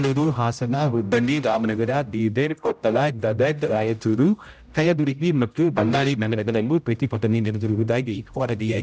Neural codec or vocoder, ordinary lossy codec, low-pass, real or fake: codec, 16 kHz, 0.5 kbps, X-Codec, HuBERT features, trained on general audio; none; none; fake